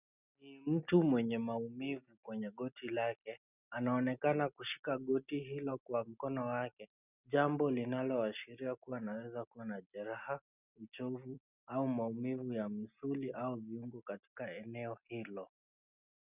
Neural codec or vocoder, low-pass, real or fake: none; 3.6 kHz; real